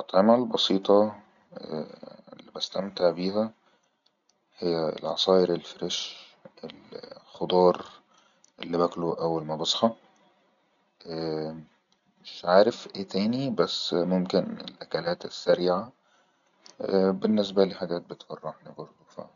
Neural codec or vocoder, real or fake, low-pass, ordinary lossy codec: none; real; 7.2 kHz; none